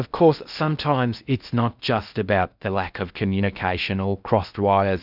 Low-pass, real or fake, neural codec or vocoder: 5.4 kHz; fake; codec, 16 kHz in and 24 kHz out, 0.6 kbps, FocalCodec, streaming, 2048 codes